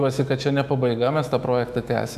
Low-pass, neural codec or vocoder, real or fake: 14.4 kHz; autoencoder, 48 kHz, 128 numbers a frame, DAC-VAE, trained on Japanese speech; fake